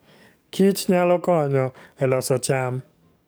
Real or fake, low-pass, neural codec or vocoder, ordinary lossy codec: fake; none; codec, 44.1 kHz, 7.8 kbps, DAC; none